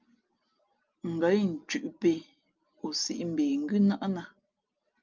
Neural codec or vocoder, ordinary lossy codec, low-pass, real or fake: none; Opus, 24 kbps; 7.2 kHz; real